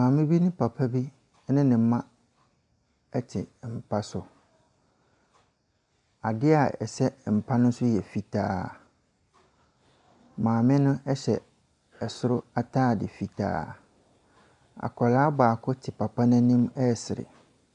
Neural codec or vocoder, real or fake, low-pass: none; real; 10.8 kHz